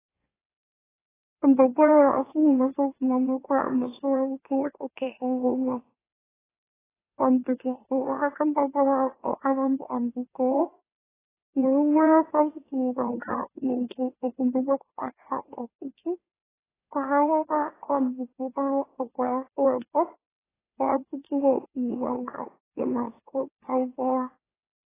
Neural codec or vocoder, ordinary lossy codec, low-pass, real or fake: autoencoder, 44.1 kHz, a latent of 192 numbers a frame, MeloTTS; AAC, 16 kbps; 3.6 kHz; fake